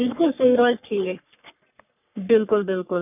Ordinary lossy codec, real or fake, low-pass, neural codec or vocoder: none; fake; 3.6 kHz; codec, 44.1 kHz, 3.4 kbps, Pupu-Codec